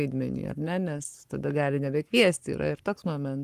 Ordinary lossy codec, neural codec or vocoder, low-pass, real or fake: Opus, 24 kbps; codec, 44.1 kHz, 7.8 kbps, Pupu-Codec; 14.4 kHz; fake